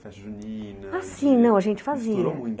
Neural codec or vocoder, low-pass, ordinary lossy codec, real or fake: none; none; none; real